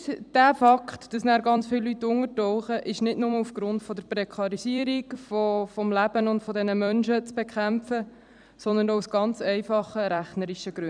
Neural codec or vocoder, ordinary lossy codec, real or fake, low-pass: vocoder, 44.1 kHz, 128 mel bands every 256 samples, BigVGAN v2; none; fake; 9.9 kHz